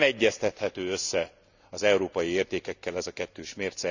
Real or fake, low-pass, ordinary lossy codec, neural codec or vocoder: real; 7.2 kHz; none; none